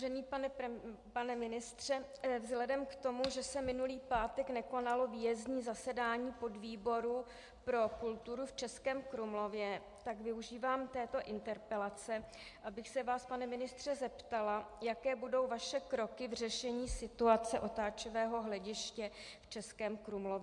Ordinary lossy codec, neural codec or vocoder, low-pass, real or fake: MP3, 64 kbps; none; 10.8 kHz; real